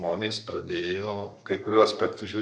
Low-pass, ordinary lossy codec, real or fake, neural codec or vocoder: 9.9 kHz; MP3, 64 kbps; fake; codec, 32 kHz, 1.9 kbps, SNAC